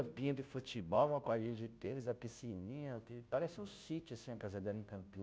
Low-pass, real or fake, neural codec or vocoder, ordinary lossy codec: none; fake; codec, 16 kHz, 0.5 kbps, FunCodec, trained on Chinese and English, 25 frames a second; none